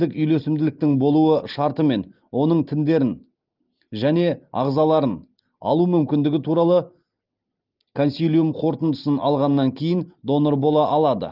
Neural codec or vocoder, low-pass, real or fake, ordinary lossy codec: none; 5.4 kHz; real; Opus, 24 kbps